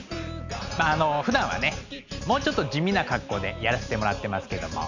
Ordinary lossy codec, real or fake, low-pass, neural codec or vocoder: none; real; 7.2 kHz; none